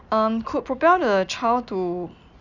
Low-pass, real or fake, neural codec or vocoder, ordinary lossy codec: 7.2 kHz; real; none; none